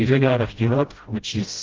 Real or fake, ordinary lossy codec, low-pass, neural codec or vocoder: fake; Opus, 16 kbps; 7.2 kHz; codec, 16 kHz, 0.5 kbps, FreqCodec, smaller model